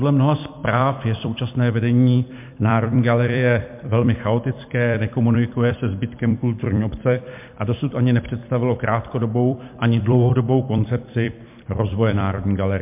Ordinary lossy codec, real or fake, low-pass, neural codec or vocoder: MP3, 32 kbps; fake; 3.6 kHz; vocoder, 44.1 kHz, 128 mel bands every 256 samples, BigVGAN v2